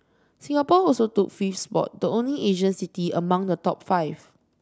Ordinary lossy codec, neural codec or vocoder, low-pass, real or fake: none; none; none; real